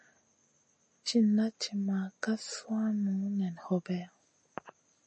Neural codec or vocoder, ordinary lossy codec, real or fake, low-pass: none; MP3, 32 kbps; real; 9.9 kHz